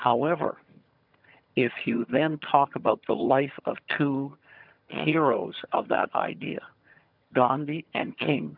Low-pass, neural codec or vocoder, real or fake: 5.4 kHz; vocoder, 22.05 kHz, 80 mel bands, HiFi-GAN; fake